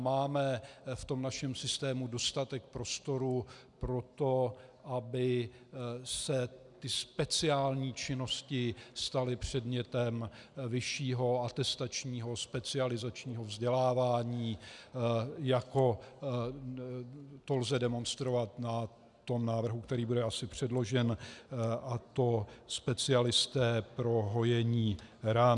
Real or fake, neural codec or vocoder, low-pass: real; none; 10.8 kHz